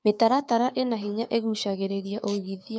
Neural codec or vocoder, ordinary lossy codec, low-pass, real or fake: codec, 16 kHz, 6 kbps, DAC; none; none; fake